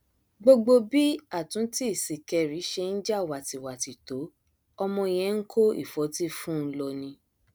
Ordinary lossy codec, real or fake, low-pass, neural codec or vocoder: none; real; none; none